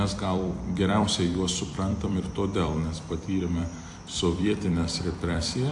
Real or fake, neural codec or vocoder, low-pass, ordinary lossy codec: fake; vocoder, 44.1 kHz, 128 mel bands every 256 samples, BigVGAN v2; 10.8 kHz; AAC, 64 kbps